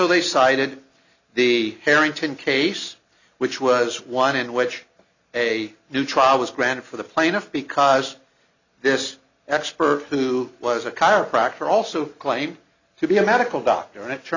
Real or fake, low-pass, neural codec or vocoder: real; 7.2 kHz; none